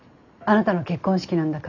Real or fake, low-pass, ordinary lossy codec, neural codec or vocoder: real; 7.2 kHz; none; none